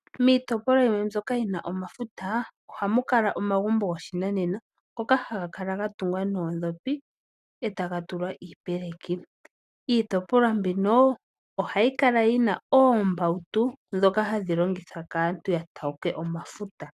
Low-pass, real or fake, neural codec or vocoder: 14.4 kHz; real; none